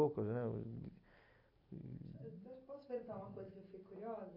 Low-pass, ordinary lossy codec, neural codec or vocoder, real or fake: 5.4 kHz; AAC, 48 kbps; none; real